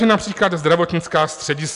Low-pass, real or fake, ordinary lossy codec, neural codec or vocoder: 10.8 kHz; real; AAC, 96 kbps; none